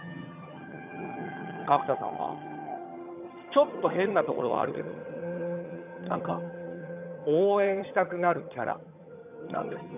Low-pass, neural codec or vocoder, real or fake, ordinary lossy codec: 3.6 kHz; vocoder, 22.05 kHz, 80 mel bands, HiFi-GAN; fake; none